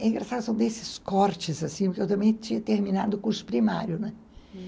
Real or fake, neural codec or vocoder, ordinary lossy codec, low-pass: real; none; none; none